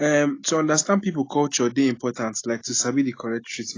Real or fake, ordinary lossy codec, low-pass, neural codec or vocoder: real; AAC, 32 kbps; 7.2 kHz; none